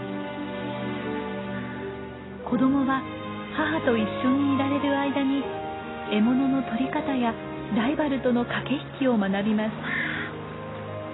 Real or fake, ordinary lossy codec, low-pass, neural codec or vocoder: real; AAC, 16 kbps; 7.2 kHz; none